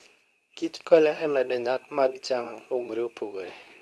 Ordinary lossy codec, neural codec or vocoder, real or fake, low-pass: none; codec, 24 kHz, 0.9 kbps, WavTokenizer, medium speech release version 2; fake; none